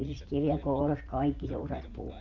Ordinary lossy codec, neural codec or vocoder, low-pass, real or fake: none; vocoder, 44.1 kHz, 80 mel bands, Vocos; 7.2 kHz; fake